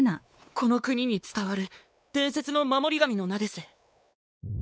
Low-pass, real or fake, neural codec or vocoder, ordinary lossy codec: none; fake; codec, 16 kHz, 4 kbps, X-Codec, WavLM features, trained on Multilingual LibriSpeech; none